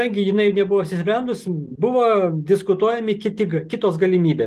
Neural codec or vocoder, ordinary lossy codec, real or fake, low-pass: none; Opus, 24 kbps; real; 14.4 kHz